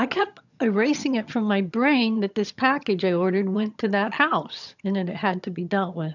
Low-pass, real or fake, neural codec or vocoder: 7.2 kHz; fake; vocoder, 22.05 kHz, 80 mel bands, HiFi-GAN